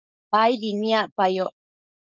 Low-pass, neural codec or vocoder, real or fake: 7.2 kHz; codec, 16 kHz, 4.8 kbps, FACodec; fake